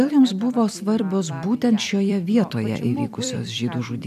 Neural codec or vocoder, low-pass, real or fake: vocoder, 44.1 kHz, 128 mel bands every 256 samples, BigVGAN v2; 14.4 kHz; fake